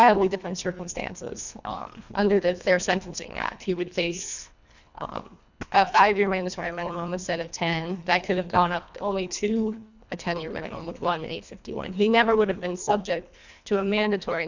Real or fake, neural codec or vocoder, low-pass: fake; codec, 24 kHz, 1.5 kbps, HILCodec; 7.2 kHz